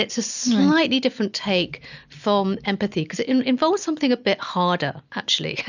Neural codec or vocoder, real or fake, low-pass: none; real; 7.2 kHz